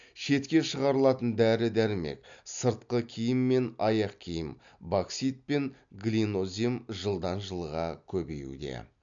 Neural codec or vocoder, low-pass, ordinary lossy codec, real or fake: none; 7.2 kHz; MP3, 64 kbps; real